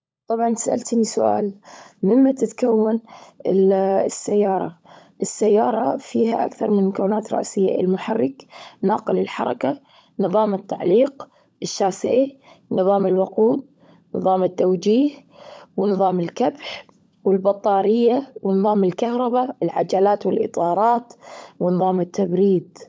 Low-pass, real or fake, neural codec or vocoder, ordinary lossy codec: none; fake; codec, 16 kHz, 16 kbps, FunCodec, trained on LibriTTS, 50 frames a second; none